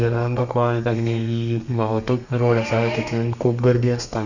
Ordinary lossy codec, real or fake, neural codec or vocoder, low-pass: none; fake; codec, 32 kHz, 1.9 kbps, SNAC; 7.2 kHz